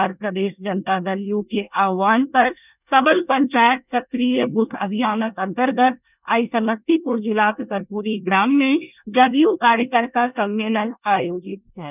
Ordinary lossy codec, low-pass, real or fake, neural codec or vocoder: none; 3.6 kHz; fake; codec, 24 kHz, 1 kbps, SNAC